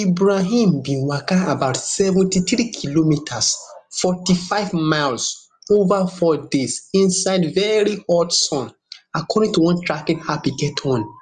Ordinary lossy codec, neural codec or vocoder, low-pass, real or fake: Opus, 32 kbps; none; 10.8 kHz; real